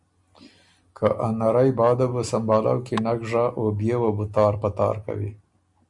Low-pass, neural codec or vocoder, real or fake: 10.8 kHz; none; real